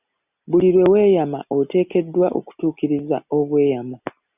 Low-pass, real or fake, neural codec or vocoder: 3.6 kHz; real; none